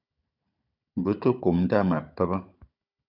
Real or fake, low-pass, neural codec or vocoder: fake; 5.4 kHz; codec, 16 kHz, 16 kbps, FunCodec, trained on Chinese and English, 50 frames a second